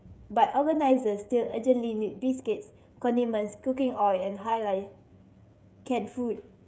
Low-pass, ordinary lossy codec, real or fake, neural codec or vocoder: none; none; fake; codec, 16 kHz, 16 kbps, FreqCodec, smaller model